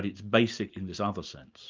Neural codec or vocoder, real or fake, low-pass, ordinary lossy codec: none; real; 7.2 kHz; Opus, 24 kbps